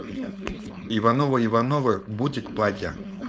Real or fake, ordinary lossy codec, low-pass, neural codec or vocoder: fake; none; none; codec, 16 kHz, 4.8 kbps, FACodec